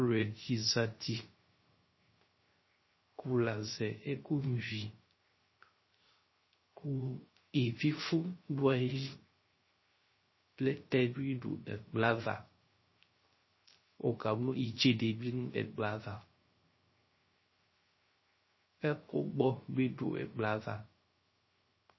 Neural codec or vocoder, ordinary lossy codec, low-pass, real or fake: codec, 16 kHz, 0.3 kbps, FocalCodec; MP3, 24 kbps; 7.2 kHz; fake